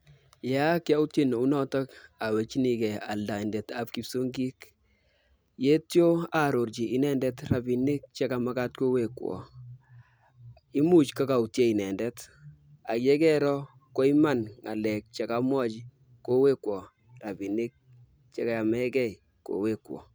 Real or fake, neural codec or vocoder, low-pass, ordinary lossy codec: real; none; none; none